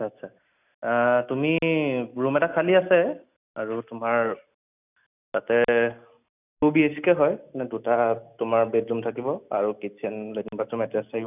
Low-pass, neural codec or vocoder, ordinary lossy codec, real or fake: 3.6 kHz; none; none; real